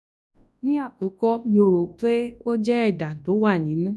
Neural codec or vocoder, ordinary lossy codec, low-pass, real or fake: codec, 24 kHz, 0.9 kbps, WavTokenizer, large speech release; none; none; fake